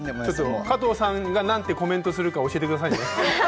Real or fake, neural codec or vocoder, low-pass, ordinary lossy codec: real; none; none; none